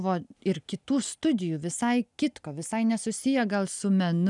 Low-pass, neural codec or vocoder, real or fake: 10.8 kHz; none; real